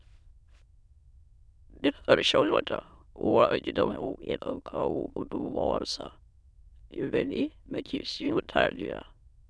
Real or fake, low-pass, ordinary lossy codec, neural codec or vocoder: fake; none; none; autoencoder, 22.05 kHz, a latent of 192 numbers a frame, VITS, trained on many speakers